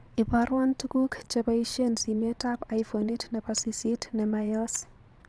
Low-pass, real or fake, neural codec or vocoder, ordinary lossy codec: none; fake; vocoder, 22.05 kHz, 80 mel bands, Vocos; none